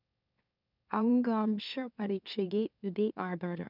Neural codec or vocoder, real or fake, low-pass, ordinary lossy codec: autoencoder, 44.1 kHz, a latent of 192 numbers a frame, MeloTTS; fake; 5.4 kHz; none